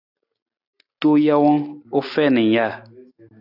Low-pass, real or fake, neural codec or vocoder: 5.4 kHz; real; none